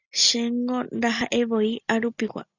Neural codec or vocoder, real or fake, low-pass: none; real; 7.2 kHz